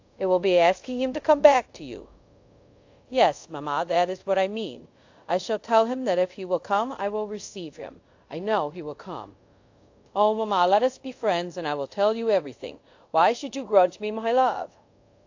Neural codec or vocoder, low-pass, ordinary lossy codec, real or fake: codec, 24 kHz, 0.5 kbps, DualCodec; 7.2 kHz; AAC, 48 kbps; fake